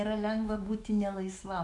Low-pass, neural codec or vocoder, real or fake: 10.8 kHz; autoencoder, 48 kHz, 128 numbers a frame, DAC-VAE, trained on Japanese speech; fake